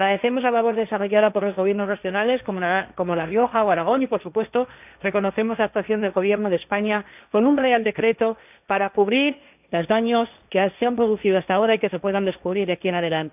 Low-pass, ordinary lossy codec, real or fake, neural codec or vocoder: 3.6 kHz; none; fake; codec, 16 kHz, 1.1 kbps, Voila-Tokenizer